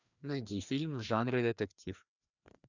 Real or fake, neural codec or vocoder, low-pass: fake; codec, 16 kHz, 2 kbps, FreqCodec, larger model; 7.2 kHz